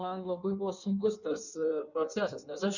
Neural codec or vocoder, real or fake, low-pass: codec, 16 kHz in and 24 kHz out, 1.1 kbps, FireRedTTS-2 codec; fake; 7.2 kHz